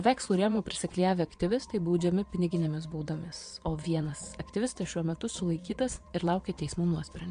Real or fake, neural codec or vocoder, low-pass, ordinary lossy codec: fake; vocoder, 22.05 kHz, 80 mel bands, Vocos; 9.9 kHz; MP3, 64 kbps